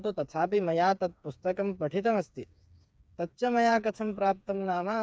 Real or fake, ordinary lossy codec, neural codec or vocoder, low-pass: fake; none; codec, 16 kHz, 4 kbps, FreqCodec, smaller model; none